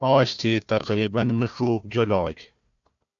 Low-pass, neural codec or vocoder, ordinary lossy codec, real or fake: 7.2 kHz; codec, 16 kHz, 1 kbps, FunCodec, trained on Chinese and English, 50 frames a second; AAC, 64 kbps; fake